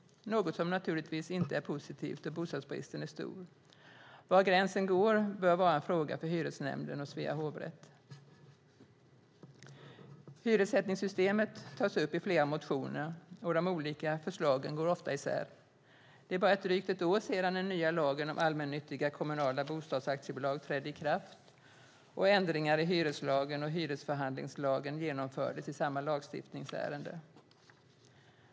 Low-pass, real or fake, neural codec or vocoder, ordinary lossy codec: none; real; none; none